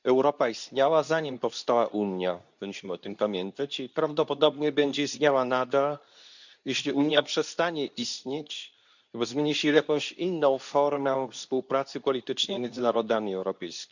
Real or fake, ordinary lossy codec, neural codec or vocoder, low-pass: fake; none; codec, 24 kHz, 0.9 kbps, WavTokenizer, medium speech release version 2; 7.2 kHz